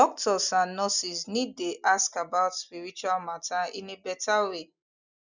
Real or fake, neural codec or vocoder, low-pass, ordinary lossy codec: real; none; 7.2 kHz; none